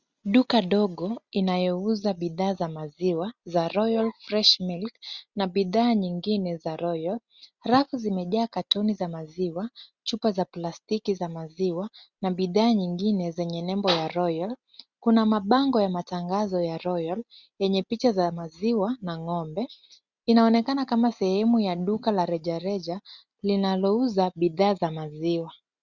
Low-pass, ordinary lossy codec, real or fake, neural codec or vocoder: 7.2 kHz; Opus, 64 kbps; real; none